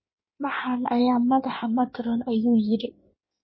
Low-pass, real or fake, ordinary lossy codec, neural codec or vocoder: 7.2 kHz; fake; MP3, 24 kbps; codec, 16 kHz in and 24 kHz out, 1.1 kbps, FireRedTTS-2 codec